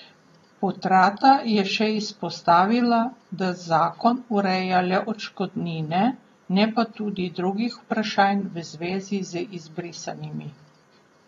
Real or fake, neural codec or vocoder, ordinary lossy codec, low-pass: real; none; AAC, 32 kbps; 14.4 kHz